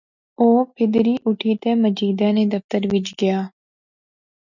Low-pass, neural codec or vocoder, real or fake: 7.2 kHz; none; real